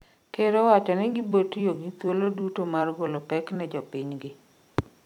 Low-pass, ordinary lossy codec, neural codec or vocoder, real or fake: 19.8 kHz; none; vocoder, 44.1 kHz, 128 mel bands every 256 samples, BigVGAN v2; fake